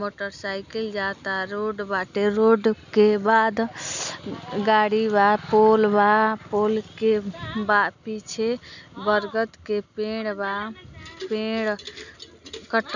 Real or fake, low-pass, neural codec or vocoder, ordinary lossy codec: real; 7.2 kHz; none; none